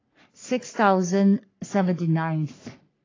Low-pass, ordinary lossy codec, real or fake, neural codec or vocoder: 7.2 kHz; AAC, 32 kbps; fake; codec, 44.1 kHz, 3.4 kbps, Pupu-Codec